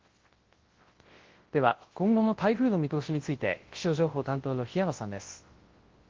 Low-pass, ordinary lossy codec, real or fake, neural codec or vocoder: 7.2 kHz; Opus, 16 kbps; fake; codec, 24 kHz, 0.9 kbps, WavTokenizer, large speech release